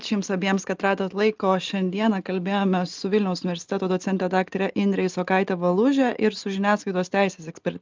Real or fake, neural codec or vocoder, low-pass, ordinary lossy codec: real; none; 7.2 kHz; Opus, 24 kbps